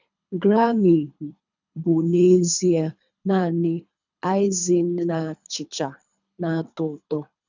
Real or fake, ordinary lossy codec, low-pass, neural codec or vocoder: fake; none; 7.2 kHz; codec, 24 kHz, 3 kbps, HILCodec